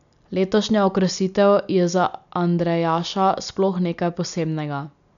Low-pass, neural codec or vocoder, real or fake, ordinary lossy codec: 7.2 kHz; none; real; none